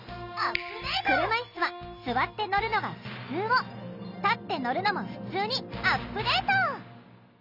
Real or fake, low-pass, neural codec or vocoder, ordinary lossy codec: real; 5.4 kHz; none; none